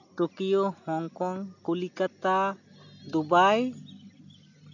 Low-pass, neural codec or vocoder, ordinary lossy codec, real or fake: 7.2 kHz; none; none; real